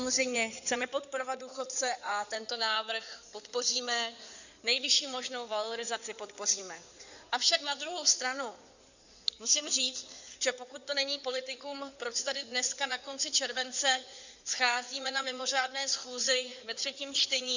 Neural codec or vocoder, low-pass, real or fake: codec, 16 kHz in and 24 kHz out, 2.2 kbps, FireRedTTS-2 codec; 7.2 kHz; fake